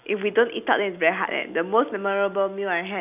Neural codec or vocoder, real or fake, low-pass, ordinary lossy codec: none; real; 3.6 kHz; none